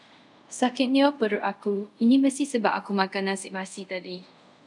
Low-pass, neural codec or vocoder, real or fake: 10.8 kHz; codec, 24 kHz, 0.5 kbps, DualCodec; fake